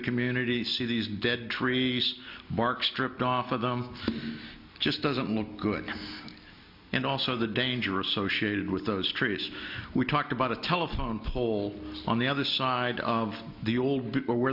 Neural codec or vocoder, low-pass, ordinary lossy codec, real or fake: none; 5.4 kHz; MP3, 48 kbps; real